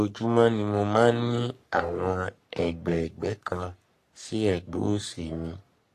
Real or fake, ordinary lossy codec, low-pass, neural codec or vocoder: fake; AAC, 48 kbps; 14.4 kHz; codec, 44.1 kHz, 3.4 kbps, Pupu-Codec